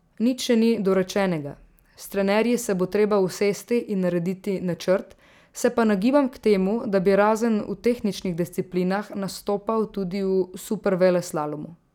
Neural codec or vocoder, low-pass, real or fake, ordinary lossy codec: none; 19.8 kHz; real; none